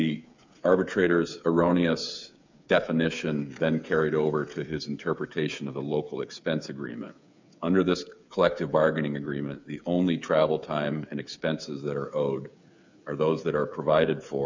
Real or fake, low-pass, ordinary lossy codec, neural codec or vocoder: fake; 7.2 kHz; MP3, 64 kbps; codec, 16 kHz, 8 kbps, FreqCodec, smaller model